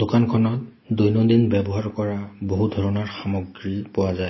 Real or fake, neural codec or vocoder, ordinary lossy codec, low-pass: real; none; MP3, 24 kbps; 7.2 kHz